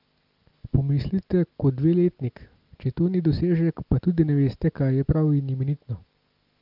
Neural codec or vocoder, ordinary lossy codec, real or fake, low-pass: none; Opus, 32 kbps; real; 5.4 kHz